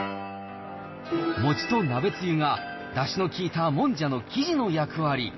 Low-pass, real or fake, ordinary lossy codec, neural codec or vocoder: 7.2 kHz; real; MP3, 24 kbps; none